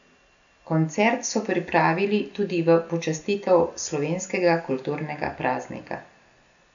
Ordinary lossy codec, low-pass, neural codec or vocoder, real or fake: none; 7.2 kHz; none; real